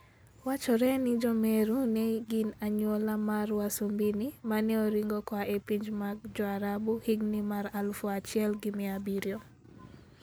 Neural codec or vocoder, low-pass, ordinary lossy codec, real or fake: none; none; none; real